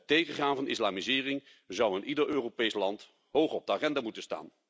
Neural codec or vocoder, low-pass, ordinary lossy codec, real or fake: none; none; none; real